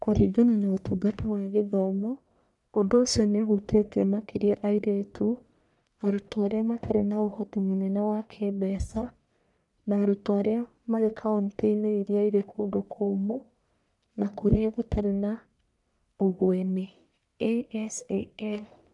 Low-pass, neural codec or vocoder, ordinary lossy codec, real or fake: 10.8 kHz; codec, 44.1 kHz, 1.7 kbps, Pupu-Codec; none; fake